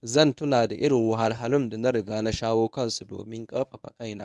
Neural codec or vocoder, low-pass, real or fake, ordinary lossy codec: codec, 24 kHz, 0.9 kbps, WavTokenizer, medium speech release version 1; none; fake; none